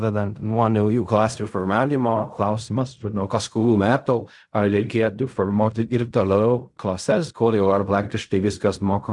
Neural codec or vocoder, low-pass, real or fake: codec, 16 kHz in and 24 kHz out, 0.4 kbps, LongCat-Audio-Codec, fine tuned four codebook decoder; 10.8 kHz; fake